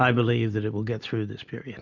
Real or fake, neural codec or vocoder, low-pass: real; none; 7.2 kHz